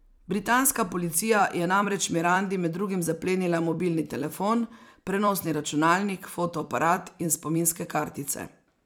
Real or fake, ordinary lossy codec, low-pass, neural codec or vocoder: fake; none; none; vocoder, 44.1 kHz, 128 mel bands every 256 samples, BigVGAN v2